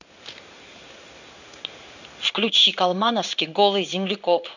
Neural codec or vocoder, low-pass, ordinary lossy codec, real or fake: codec, 16 kHz in and 24 kHz out, 1 kbps, XY-Tokenizer; 7.2 kHz; none; fake